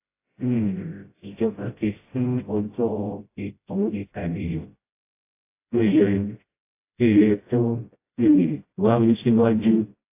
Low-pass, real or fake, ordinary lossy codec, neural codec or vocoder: 3.6 kHz; fake; AAC, 24 kbps; codec, 16 kHz, 0.5 kbps, FreqCodec, smaller model